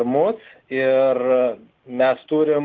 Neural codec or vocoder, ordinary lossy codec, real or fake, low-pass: none; Opus, 32 kbps; real; 7.2 kHz